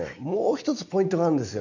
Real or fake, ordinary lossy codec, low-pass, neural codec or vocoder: real; none; 7.2 kHz; none